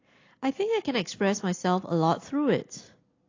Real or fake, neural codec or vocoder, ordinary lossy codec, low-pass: real; none; AAC, 32 kbps; 7.2 kHz